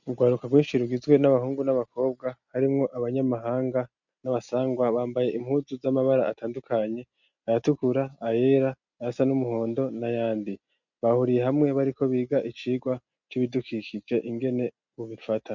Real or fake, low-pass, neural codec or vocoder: real; 7.2 kHz; none